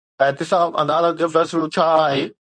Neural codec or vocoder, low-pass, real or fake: codec, 24 kHz, 0.9 kbps, WavTokenizer, medium speech release version 2; 9.9 kHz; fake